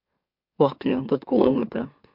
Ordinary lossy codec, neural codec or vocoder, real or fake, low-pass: MP3, 48 kbps; autoencoder, 44.1 kHz, a latent of 192 numbers a frame, MeloTTS; fake; 5.4 kHz